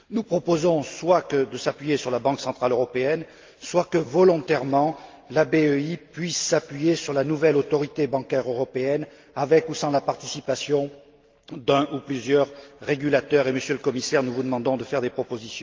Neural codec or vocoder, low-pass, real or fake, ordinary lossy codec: none; 7.2 kHz; real; Opus, 32 kbps